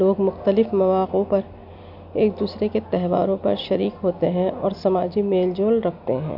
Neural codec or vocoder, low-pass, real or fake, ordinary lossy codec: none; 5.4 kHz; real; AAC, 48 kbps